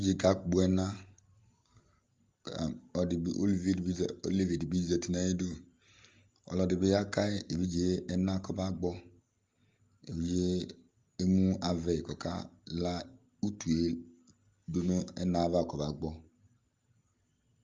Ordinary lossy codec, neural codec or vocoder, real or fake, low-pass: Opus, 32 kbps; none; real; 7.2 kHz